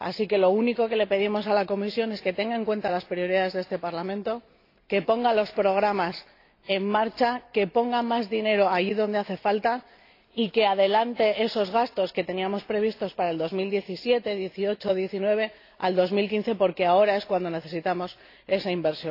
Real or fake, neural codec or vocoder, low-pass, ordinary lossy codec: real; none; 5.4 kHz; AAC, 32 kbps